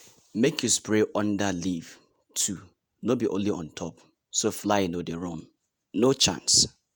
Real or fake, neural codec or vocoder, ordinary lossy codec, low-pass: fake; vocoder, 48 kHz, 128 mel bands, Vocos; none; none